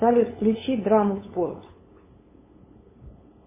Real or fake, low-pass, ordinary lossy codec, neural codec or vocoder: fake; 3.6 kHz; MP3, 16 kbps; codec, 16 kHz, 8 kbps, FunCodec, trained on LibriTTS, 25 frames a second